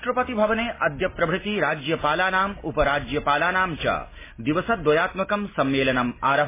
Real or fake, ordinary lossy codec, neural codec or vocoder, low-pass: real; MP3, 16 kbps; none; 3.6 kHz